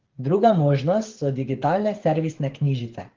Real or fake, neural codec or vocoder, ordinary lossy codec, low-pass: fake; codec, 16 kHz, 8 kbps, FreqCodec, smaller model; Opus, 16 kbps; 7.2 kHz